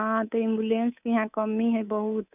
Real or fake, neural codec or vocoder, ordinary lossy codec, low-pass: fake; codec, 24 kHz, 3.1 kbps, DualCodec; none; 3.6 kHz